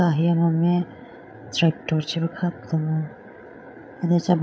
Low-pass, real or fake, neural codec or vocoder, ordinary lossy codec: none; fake; codec, 16 kHz, 8 kbps, FreqCodec, larger model; none